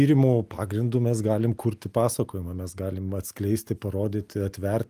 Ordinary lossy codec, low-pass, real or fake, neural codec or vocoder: Opus, 32 kbps; 14.4 kHz; real; none